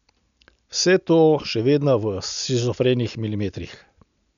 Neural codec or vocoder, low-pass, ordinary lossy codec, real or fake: none; 7.2 kHz; none; real